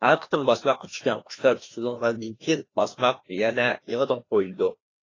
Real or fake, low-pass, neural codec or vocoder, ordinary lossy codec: fake; 7.2 kHz; codec, 16 kHz, 1 kbps, FreqCodec, larger model; AAC, 32 kbps